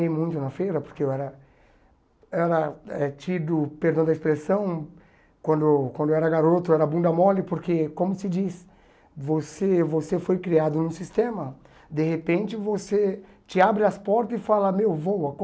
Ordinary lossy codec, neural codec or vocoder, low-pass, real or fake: none; none; none; real